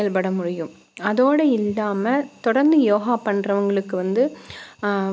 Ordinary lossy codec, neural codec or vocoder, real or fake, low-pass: none; none; real; none